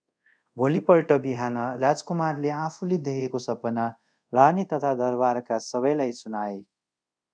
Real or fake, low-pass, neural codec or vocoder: fake; 9.9 kHz; codec, 24 kHz, 0.5 kbps, DualCodec